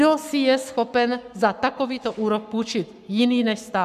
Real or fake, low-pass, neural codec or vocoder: fake; 14.4 kHz; codec, 44.1 kHz, 7.8 kbps, Pupu-Codec